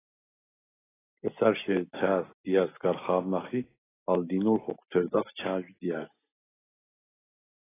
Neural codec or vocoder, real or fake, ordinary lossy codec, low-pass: none; real; AAC, 16 kbps; 3.6 kHz